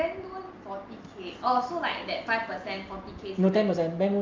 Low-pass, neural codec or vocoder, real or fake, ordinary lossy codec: 7.2 kHz; none; real; Opus, 24 kbps